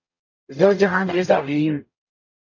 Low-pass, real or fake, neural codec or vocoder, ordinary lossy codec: 7.2 kHz; fake; codec, 44.1 kHz, 0.9 kbps, DAC; AAC, 48 kbps